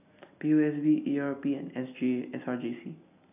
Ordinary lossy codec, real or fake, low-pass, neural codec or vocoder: none; real; 3.6 kHz; none